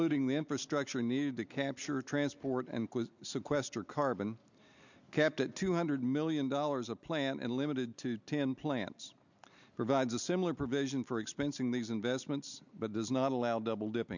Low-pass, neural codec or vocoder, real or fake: 7.2 kHz; none; real